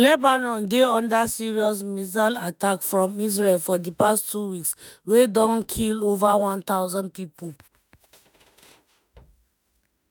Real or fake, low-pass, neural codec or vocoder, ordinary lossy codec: fake; none; autoencoder, 48 kHz, 32 numbers a frame, DAC-VAE, trained on Japanese speech; none